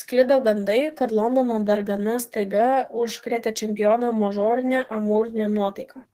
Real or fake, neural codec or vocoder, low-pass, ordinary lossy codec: fake; codec, 32 kHz, 1.9 kbps, SNAC; 14.4 kHz; Opus, 16 kbps